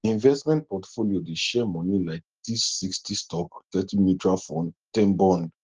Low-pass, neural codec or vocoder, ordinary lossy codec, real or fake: 7.2 kHz; none; Opus, 16 kbps; real